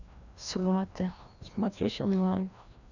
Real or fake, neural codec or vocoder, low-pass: fake; codec, 16 kHz, 1 kbps, FreqCodec, larger model; 7.2 kHz